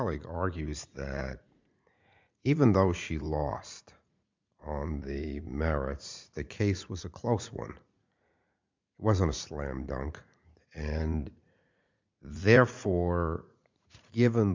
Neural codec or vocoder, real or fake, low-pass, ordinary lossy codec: vocoder, 44.1 kHz, 80 mel bands, Vocos; fake; 7.2 kHz; MP3, 64 kbps